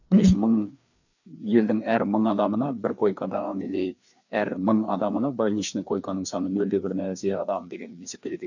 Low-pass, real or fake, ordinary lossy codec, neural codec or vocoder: 7.2 kHz; fake; none; codec, 16 kHz, 2 kbps, FreqCodec, larger model